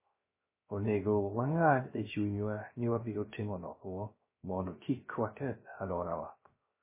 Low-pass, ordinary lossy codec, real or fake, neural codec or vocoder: 3.6 kHz; MP3, 16 kbps; fake; codec, 16 kHz, 0.3 kbps, FocalCodec